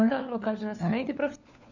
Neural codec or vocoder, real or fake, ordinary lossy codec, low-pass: codec, 16 kHz, 4 kbps, FunCodec, trained on LibriTTS, 50 frames a second; fake; none; 7.2 kHz